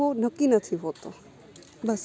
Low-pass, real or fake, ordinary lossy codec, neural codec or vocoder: none; real; none; none